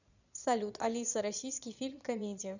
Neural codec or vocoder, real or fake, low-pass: vocoder, 22.05 kHz, 80 mel bands, Vocos; fake; 7.2 kHz